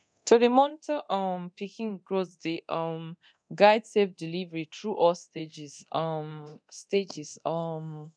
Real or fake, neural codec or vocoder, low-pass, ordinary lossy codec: fake; codec, 24 kHz, 0.9 kbps, DualCodec; 9.9 kHz; none